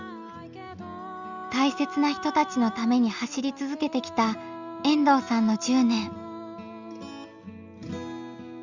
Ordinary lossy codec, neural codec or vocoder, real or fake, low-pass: Opus, 64 kbps; none; real; 7.2 kHz